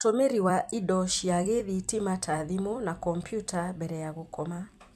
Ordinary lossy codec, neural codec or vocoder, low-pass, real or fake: MP3, 96 kbps; vocoder, 44.1 kHz, 128 mel bands every 256 samples, BigVGAN v2; 14.4 kHz; fake